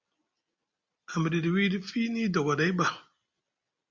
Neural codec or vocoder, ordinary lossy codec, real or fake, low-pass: none; Opus, 64 kbps; real; 7.2 kHz